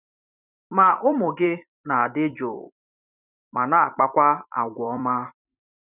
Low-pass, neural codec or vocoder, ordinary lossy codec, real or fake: 3.6 kHz; vocoder, 44.1 kHz, 128 mel bands every 256 samples, BigVGAN v2; none; fake